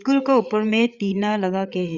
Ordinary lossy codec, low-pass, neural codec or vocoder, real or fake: Opus, 64 kbps; 7.2 kHz; codec, 16 kHz, 8 kbps, FreqCodec, larger model; fake